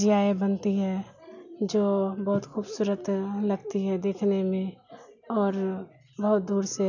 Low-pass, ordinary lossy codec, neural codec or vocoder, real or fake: 7.2 kHz; none; none; real